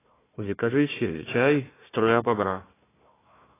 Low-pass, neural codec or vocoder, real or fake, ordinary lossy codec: 3.6 kHz; codec, 16 kHz, 1 kbps, FunCodec, trained on Chinese and English, 50 frames a second; fake; AAC, 16 kbps